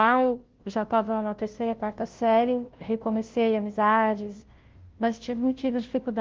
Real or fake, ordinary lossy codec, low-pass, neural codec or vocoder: fake; Opus, 16 kbps; 7.2 kHz; codec, 16 kHz, 0.5 kbps, FunCodec, trained on Chinese and English, 25 frames a second